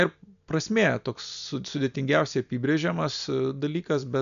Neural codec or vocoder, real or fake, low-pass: none; real; 7.2 kHz